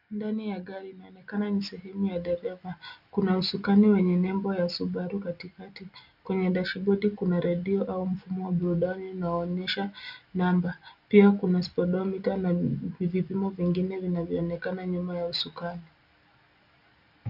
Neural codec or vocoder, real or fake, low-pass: none; real; 5.4 kHz